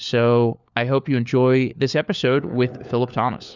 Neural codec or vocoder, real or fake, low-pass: codec, 16 kHz, 4 kbps, FunCodec, trained on LibriTTS, 50 frames a second; fake; 7.2 kHz